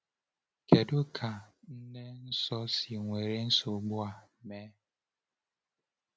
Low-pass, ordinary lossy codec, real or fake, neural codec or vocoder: none; none; real; none